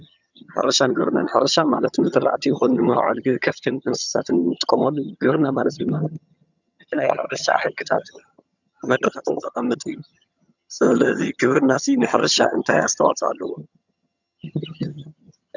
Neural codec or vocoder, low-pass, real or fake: vocoder, 22.05 kHz, 80 mel bands, HiFi-GAN; 7.2 kHz; fake